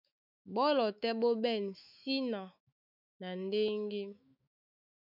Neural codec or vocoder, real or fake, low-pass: autoencoder, 48 kHz, 128 numbers a frame, DAC-VAE, trained on Japanese speech; fake; 5.4 kHz